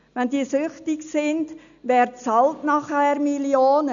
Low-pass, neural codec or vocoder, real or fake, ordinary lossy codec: 7.2 kHz; none; real; none